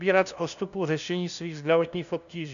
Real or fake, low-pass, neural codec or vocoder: fake; 7.2 kHz; codec, 16 kHz, 0.5 kbps, FunCodec, trained on LibriTTS, 25 frames a second